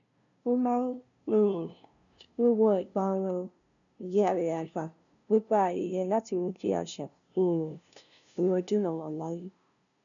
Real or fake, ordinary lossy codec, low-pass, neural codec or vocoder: fake; none; 7.2 kHz; codec, 16 kHz, 0.5 kbps, FunCodec, trained on LibriTTS, 25 frames a second